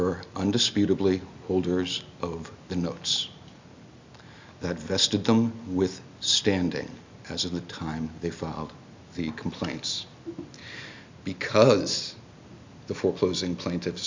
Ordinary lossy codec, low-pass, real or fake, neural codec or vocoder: MP3, 64 kbps; 7.2 kHz; real; none